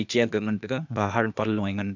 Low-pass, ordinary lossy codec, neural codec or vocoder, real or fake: 7.2 kHz; none; codec, 16 kHz, 0.8 kbps, ZipCodec; fake